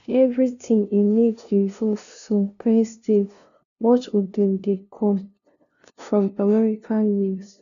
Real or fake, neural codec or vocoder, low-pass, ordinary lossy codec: fake; codec, 16 kHz, 0.5 kbps, FunCodec, trained on LibriTTS, 25 frames a second; 7.2 kHz; none